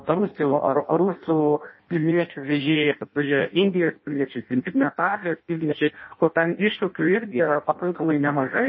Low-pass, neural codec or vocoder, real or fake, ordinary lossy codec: 7.2 kHz; codec, 16 kHz in and 24 kHz out, 0.6 kbps, FireRedTTS-2 codec; fake; MP3, 24 kbps